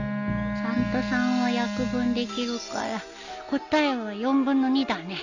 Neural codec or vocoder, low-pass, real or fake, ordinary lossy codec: none; 7.2 kHz; real; none